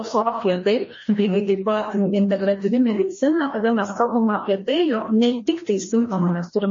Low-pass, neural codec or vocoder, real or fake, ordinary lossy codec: 7.2 kHz; codec, 16 kHz, 1 kbps, FreqCodec, larger model; fake; MP3, 32 kbps